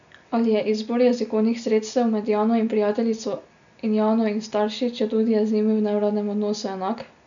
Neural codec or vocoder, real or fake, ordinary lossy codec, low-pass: none; real; none; 7.2 kHz